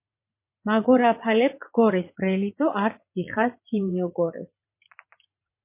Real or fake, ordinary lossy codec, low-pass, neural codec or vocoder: fake; MP3, 24 kbps; 3.6 kHz; vocoder, 44.1 kHz, 80 mel bands, Vocos